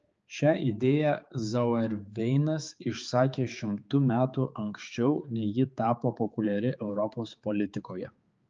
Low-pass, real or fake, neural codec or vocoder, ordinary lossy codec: 7.2 kHz; fake; codec, 16 kHz, 4 kbps, X-Codec, HuBERT features, trained on balanced general audio; Opus, 24 kbps